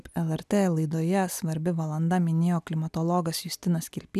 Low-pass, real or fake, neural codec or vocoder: 14.4 kHz; real; none